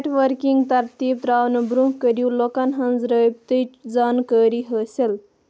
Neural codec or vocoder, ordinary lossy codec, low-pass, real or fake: none; none; none; real